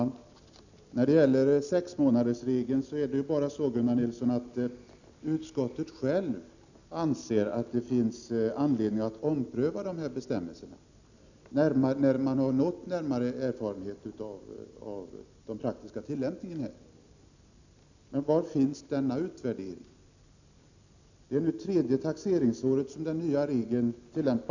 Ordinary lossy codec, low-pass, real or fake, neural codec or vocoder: none; 7.2 kHz; real; none